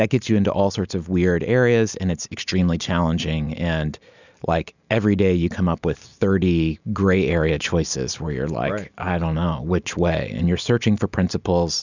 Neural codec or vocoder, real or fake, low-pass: none; real; 7.2 kHz